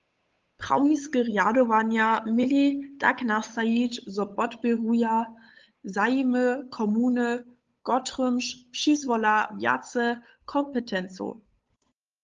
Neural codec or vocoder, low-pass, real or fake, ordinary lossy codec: codec, 16 kHz, 8 kbps, FunCodec, trained on Chinese and English, 25 frames a second; 7.2 kHz; fake; Opus, 32 kbps